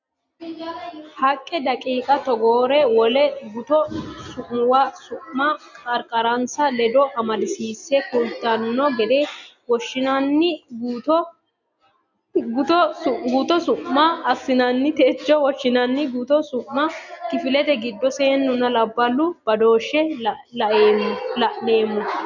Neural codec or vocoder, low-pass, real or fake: none; 7.2 kHz; real